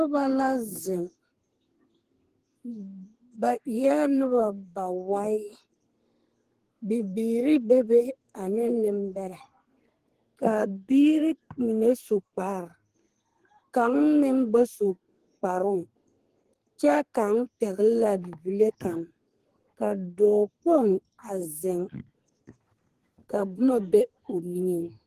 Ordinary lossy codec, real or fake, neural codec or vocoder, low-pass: Opus, 16 kbps; fake; codec, 32 kHz, 1.9 kbps, SNAC; 14.4 kHz